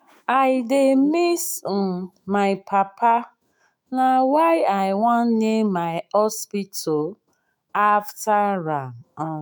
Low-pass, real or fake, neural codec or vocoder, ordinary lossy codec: none; fake; autoencoder, 48 kHz, 128 numbers a frame, DAC-VAE, trained on Japanese speech; none